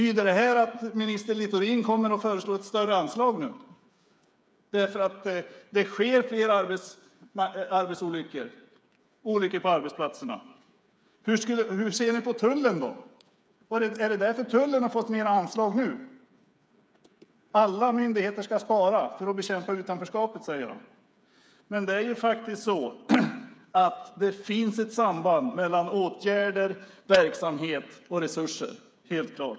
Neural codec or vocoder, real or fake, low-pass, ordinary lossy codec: codec, 16 kHz, 8 kbps, FreqCodec, smaller model; fake; none; none